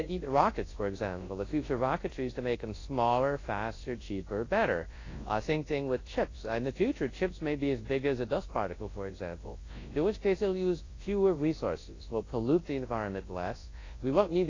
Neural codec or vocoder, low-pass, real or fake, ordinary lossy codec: codec, 24 kHz, 0.9 kbps, WavTokenizer, large speech release; 7.2 kHz; fake; AAC, 32 kbps